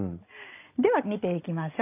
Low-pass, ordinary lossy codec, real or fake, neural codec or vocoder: 3.6 kHz; none; real; none